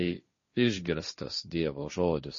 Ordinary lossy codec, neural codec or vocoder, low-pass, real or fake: MP3, 32 kbps; codec, 16 kHz, 1.1 kbps, Voila-Tokenizer; 7.2 kHz; fake